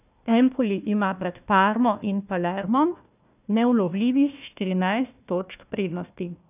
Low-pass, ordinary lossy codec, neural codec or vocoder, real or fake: 3.6 kHz; none; codec, 16 kHz, 1 kbps, FunCodec, trained on Chinese and English, 50 frames a second; fake